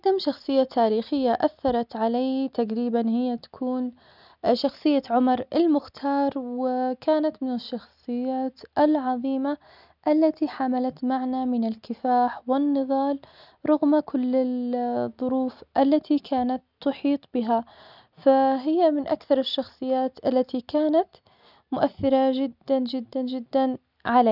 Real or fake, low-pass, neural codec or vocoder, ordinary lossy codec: real; 5.4 kHz; none; none